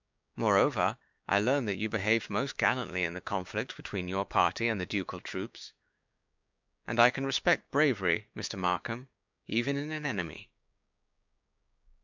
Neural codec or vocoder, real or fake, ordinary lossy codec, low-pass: codec, 16 kHz, 6 kbps, DAC; fake; MP3, 64 kbps; 7.2 kHz